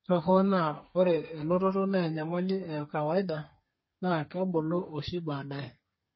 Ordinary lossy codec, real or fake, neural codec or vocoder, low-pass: MP3, 24 kbps; fake; codec, 32 kHz, 1.9 kbps, SNAC; 7.2 kHz